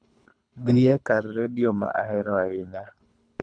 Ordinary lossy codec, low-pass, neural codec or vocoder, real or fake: none; 9.9 kHz; codec, 24 kHz, 3 kbps, HILCodec; fake